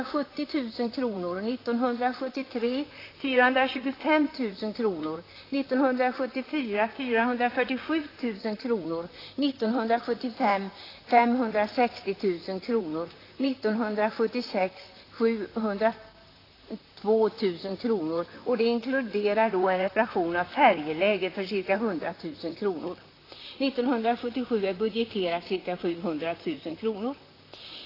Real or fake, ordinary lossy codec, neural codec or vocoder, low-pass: fake; AAC, 24 kbps; vocoder, 44.1 kHz, 128 mel bands, Pupu-Vocoder; 5.4 kHz